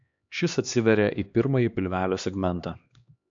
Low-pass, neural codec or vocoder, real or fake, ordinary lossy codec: 7.2 kHz; codec, 16 kHz, 2 kbps, X-Codec, HuBERT features, trained on LibriSpeech; fake; AAC, 64 kbps